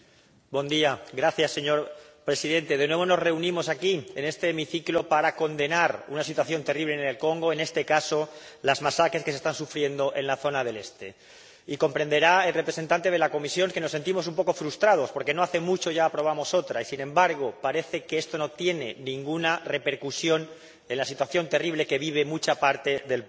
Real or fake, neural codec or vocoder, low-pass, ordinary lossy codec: real; none; none; none